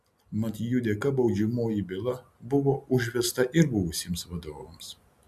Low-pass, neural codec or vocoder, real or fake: 14.4 kHz; none; real